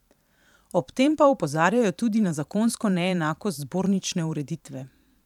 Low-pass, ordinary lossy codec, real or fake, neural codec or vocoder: 19.8 kHz; none; fake; vocoder, 44.1 kHz, 128 mel bands every 512 samples, BigVGAN v2